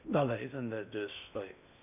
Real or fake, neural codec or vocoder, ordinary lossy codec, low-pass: fake; codec, 16 kHz in and 24 kHz out, 0.6 kbps, FocalCodec, streaming, 2048 codes; none; 3.6 kHz